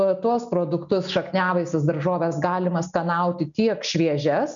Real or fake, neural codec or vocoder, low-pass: real; none; 7.2 kHz